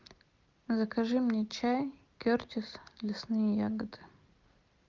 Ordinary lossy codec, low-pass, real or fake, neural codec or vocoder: Opus, 24 kbps; 7.2 kHz; real; none